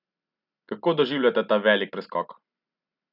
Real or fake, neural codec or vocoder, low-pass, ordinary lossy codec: real; none; 5.4 kHz; none